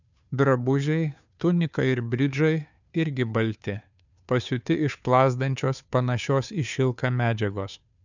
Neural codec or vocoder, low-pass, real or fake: codec, 16 kHz, 2 kbps, FunCodec, trained on Chinese and English, 25 frames a second; 7.2 kHz; fake